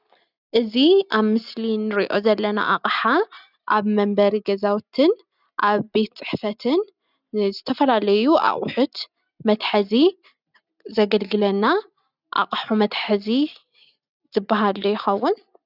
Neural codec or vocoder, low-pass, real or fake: none; 5.4 kHz; real